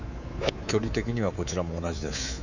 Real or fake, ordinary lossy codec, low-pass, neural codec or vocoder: fake; none; 7.2 kHz; codec, 24 kHz, 3.1 kbps, DualCodec